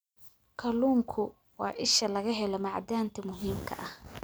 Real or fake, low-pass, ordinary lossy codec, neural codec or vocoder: real; none; none; none